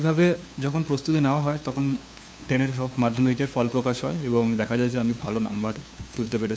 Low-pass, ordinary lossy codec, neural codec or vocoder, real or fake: none; none; codec, 16 kHz, 2 kbps, FunCodec, trained on LibriTTS, 25 frames a second; fake